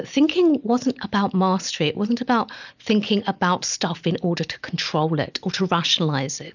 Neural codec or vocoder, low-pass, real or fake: none; 7.2 kHz; real